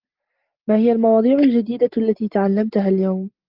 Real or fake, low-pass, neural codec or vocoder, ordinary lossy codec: real; 5.4 kHz; none; Opus, 32 kbps